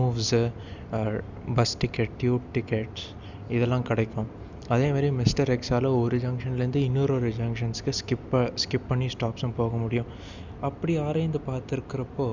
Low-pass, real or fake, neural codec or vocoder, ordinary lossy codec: 7.2 kHz; real; none; none